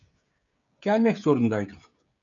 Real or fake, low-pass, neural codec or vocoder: fake; 7.2 kHz; codec, 16 kHz, 16 kbps, FreqCodec, smaller model